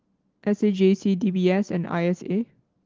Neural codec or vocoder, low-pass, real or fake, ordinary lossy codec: none; 7.2 kHz; real; Opus, 16 kbps